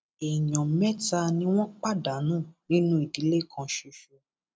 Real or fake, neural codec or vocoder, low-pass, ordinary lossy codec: real; none; none; none